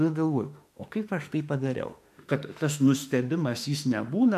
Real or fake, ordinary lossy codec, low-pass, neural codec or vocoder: fake; MP3, 96 kbps; 14.4 kHz; autoencoder, 48 kHz, 32 numbers a frame, DAC-VAE, trained on Japanese speech